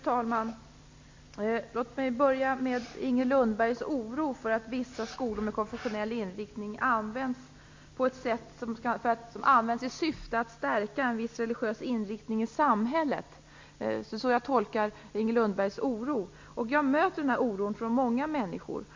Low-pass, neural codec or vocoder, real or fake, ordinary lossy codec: 7.2 kHz; none; real; MP3, 48 kbps